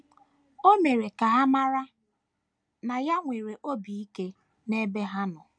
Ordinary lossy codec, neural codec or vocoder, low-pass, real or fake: none; none; 9.9 kHz; real